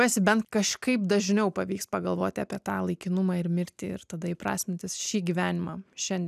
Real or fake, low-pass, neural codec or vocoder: real; 14.4 kHz; none